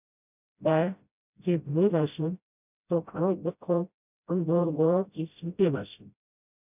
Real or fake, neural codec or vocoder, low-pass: fake; codec, 16 kHz, 0.5 kbps, FreqCodec, smaller model; 3.6 kHz